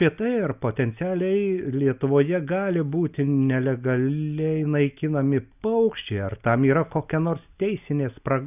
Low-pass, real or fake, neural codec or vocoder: 3.6 kHz; real; none